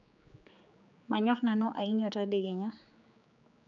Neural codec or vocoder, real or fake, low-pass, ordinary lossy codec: codec, 16 kHz, 4 kbps, X-Codec, HuBERT features, trained on general audio; fake; 7.2 kHz; none